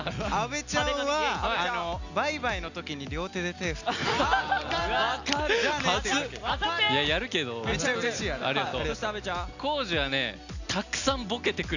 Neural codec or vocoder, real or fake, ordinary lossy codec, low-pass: none; real; none; 7.2 kHz